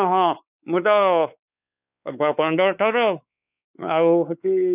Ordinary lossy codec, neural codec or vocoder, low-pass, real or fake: none; codec, 16 kHz, 4 kbps, X-Codec, WavLM features, trained on Multilingual LibriSpeech; 3.6 kHz; fake